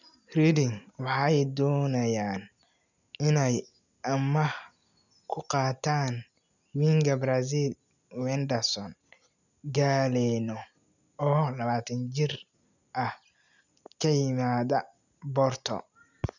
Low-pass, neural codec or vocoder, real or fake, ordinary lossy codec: 7.2 kHz; none; real; none